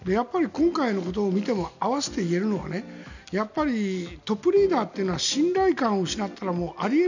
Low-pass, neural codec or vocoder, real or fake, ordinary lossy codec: 7.2 kHz; none; real; none